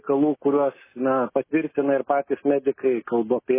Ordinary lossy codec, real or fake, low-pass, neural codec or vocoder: MP3, 16 kbps; real; 3.6 kHz; none